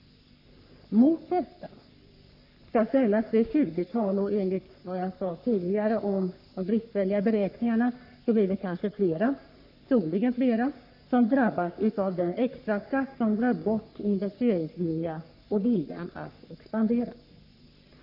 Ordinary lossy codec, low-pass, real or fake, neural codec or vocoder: AAC, 48 kbps; 5.4 kHz; fake; codec, 44.1 kHz, 3.4 kbps, Pupu-Codec